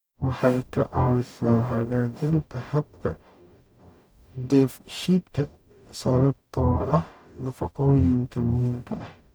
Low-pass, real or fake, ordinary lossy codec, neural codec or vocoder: none; fake; none; codec, 44.1 kHz, 0.9 kbps, DAC